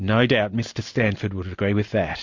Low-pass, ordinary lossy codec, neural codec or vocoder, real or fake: 7.2 kHz; MP3, 48 kbps; none; real